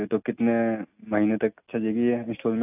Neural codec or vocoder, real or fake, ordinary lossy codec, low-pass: none; real; AAC, 32 kbps; 3.6 kHz